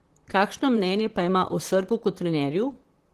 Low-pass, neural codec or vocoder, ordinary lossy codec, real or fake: 14.4 kHz; vocoder, 44.1 kHz, 128 mel bands, Pupu-Vocoder; Opus, 16 kbps; fake